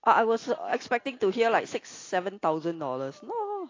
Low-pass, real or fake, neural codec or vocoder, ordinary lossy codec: 7.2 kHz; real; none; AAC, 32 kbps